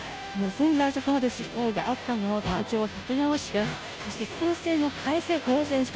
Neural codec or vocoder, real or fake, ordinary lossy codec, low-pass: codec, 16 kHz, 0.5 kbps, FunCodec, trained on Chinese and English, 25 frames a second; fake; none; none